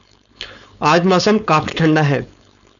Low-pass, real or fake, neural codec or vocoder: 7.2 kHz; fake; codec, 16 kHz, 4.8 kbps, FACodec